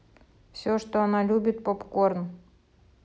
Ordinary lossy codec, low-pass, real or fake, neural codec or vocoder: none; none; real; none